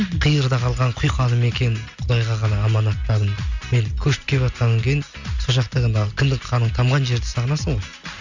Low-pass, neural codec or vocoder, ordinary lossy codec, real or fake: 7.2 kHz; none; none; real